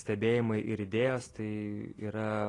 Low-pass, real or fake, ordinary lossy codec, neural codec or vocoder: 10.8 kHz; real; AAC, 32 kbps; none